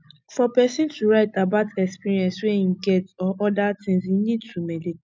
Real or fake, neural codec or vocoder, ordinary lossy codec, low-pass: real; none; none; none